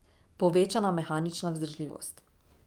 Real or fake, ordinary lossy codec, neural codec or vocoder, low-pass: fake; Opus, 32 kbps; codec, 44.1 kHz, 7.8 kbps, DAC; 19.8 kHz